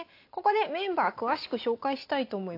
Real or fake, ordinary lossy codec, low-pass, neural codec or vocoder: real; AAC, 32 kbps; 5.4 kHz; none